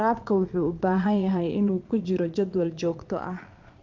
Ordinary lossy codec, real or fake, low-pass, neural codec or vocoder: Opus, 24 kbps; fake; 7.2 kHz; vocoder, 22.05 kHz, 80 mel bands, Vocos